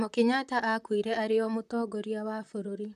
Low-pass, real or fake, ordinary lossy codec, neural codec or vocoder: 14.4 kHz; fake; none; vocoder, 44.1 kHz, 128 mel bands, Pupu-Vocoder